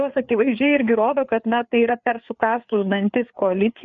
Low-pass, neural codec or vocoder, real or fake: 7.2 kHz; codec, 16 kHz, 4 kbps, FreqCodec, larger model; fake